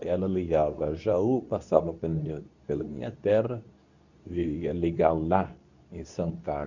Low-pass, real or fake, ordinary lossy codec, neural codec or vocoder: 7.2 kHz; fake; none; codec, 24 kHz, 0.9 kbps, WavTokenizer, medium speech release version 2